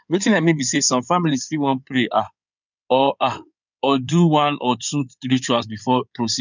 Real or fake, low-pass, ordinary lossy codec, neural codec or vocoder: fake; 7.2 kHz; none; codec, 16 kHz in and 24 kHz out, 2.2 kbps, FireRedTTS-2 codec